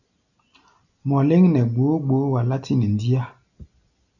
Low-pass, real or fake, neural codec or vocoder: 7.2 kHz; real; none